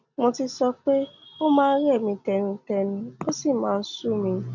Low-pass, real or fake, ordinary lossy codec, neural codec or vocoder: 7.2 kHz; real; none; none